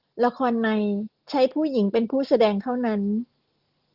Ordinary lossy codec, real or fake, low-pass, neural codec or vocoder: Opus, 16 kbps; real; 5.4 kHz; none